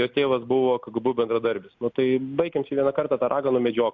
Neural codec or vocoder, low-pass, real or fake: none; 7.2 kHz; real